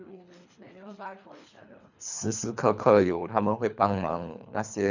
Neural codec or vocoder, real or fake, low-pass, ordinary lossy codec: codec, 24 kHz, 3 kbps, HILCodec; fake; 7.2 kHz; none